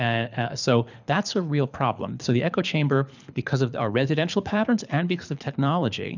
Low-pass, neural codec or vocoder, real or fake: 7.2 kHz; codec, 24 kHz, 6 kbps, HILCodec; fake